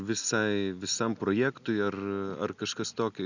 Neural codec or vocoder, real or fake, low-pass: none; real; 7.2 kHz